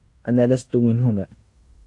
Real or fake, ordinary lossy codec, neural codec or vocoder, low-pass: fake; AAC, 48 kbps; codec, 16 kHz in and 24 kHz out, 0.9 kbps, LongCat-Audio-Codec, fine tuned four codebook decoder; 10.8 kHz